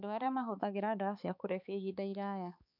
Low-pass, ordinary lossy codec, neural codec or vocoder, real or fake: 5.4 kHz; none; codec, 16 kHz, 4 kbps, X-Codec, HuBERT features, trained on balanced general audio; fake